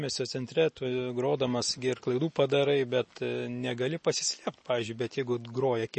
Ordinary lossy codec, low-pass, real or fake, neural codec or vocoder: MP3, 32 kbps; 10.8 kHz; real; none